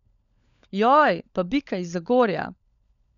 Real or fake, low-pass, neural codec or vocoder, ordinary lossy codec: fake; 7.2 kHz; codec, 16 kHz, 16 kbps, FunCodec, trained on LibriTTS, 50 frames a second; none